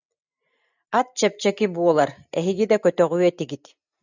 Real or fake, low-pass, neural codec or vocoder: real; 7.2 kHz; none